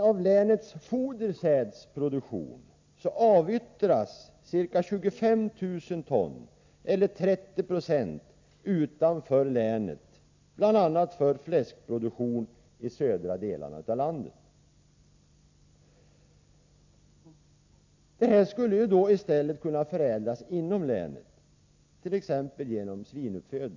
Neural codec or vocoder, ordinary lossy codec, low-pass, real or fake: none; AAC, 48 kbps; 7.2 kHz; real